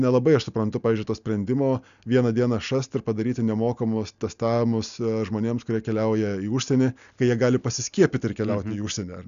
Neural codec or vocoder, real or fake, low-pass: none; real; 7.2 kHz